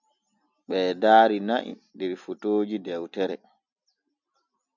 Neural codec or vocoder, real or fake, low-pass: none; real; 7.2 kHz